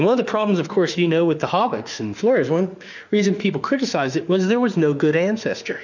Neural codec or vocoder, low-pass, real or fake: autoencoder, 48 kHz, 32 numbers a frame, DAC-VAE, trained on Japanese speech; 7.2 kHz; fake